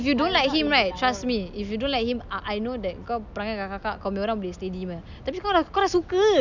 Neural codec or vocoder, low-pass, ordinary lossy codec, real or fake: none; 7.2 kHz; none; real